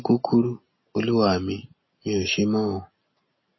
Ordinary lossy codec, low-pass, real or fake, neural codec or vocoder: MP3, 24 kbps; 7.2 kHz; real; none